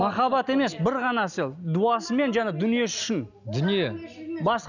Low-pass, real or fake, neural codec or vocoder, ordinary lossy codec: 7.2 kHz; real; none; none